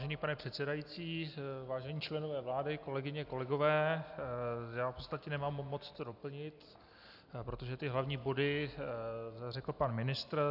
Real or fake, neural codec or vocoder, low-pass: real; none; 5.4 kHz